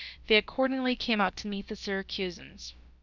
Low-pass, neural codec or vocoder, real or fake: 7.2 kHz; codec, 16 kHz, about 1 kbps, DyCAST, with the encoder's durations; fake